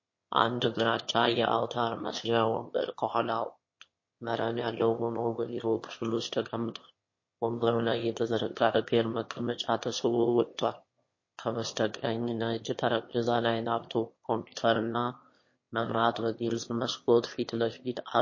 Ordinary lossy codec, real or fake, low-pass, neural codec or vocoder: MP3, 32 kbps; fake; 7.2 kHz; autoencoder, 22.05 kHz, a latent of 192 numbers a frame, VITS, trained on one speaker